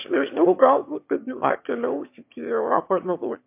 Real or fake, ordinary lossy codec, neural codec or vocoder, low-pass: fake; MP3, 32 kbps; autoencoder, 22.05 kHz, a latent of 192 numbers a frame, VITS, trained on one speaker; 3.6 kHz